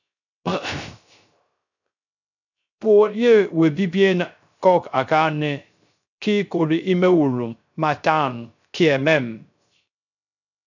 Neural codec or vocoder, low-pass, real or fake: codec, 16 kHz, 0.3 kbps, FocalCodec; 7.2 kHz; fake